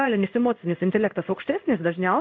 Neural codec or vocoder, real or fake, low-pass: codec, 16 kHz in and 24 kHz out, 1 kbps, XY-Tokenizer; fake; 7.2 kHz